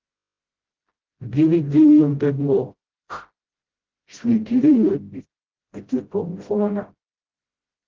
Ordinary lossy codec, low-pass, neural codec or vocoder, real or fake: Opus, 16 kbps; 7.2 kHz; codec, 16 kHz, 0.5 kbps, FreqCodec, smaller model; fake